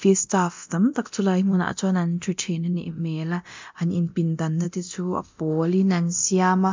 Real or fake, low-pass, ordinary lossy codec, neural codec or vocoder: fake; 7.2 kHz; MP3, 64 kbps; codec, 24 kHz, 0.9 kbps, DualCodec